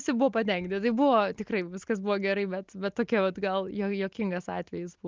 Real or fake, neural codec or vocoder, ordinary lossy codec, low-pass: real; none; Opus, 32 kbps; 7.2 kHz